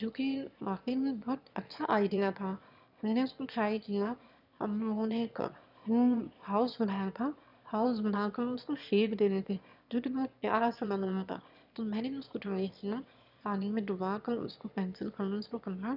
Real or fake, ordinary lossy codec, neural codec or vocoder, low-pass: fake; none; autoencoder, 22.05 kHz, a latent of 192 numbers a frame, VITS, trained on one speaker; 5.4 kHz